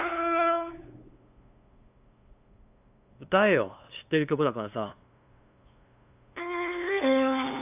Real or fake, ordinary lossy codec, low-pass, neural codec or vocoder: fake; AAC, 32 kbps; 3.6 kHz; codec, 16 kHz, 2 kbps, FunCodec, trained on LibriTTS, 25 frames a second